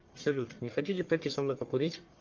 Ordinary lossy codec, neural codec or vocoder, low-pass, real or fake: Opus, 32 kbps; codec, 44.1 kHz, 1.7 kbps, Pupu-Codec; 7.2 kHz; fake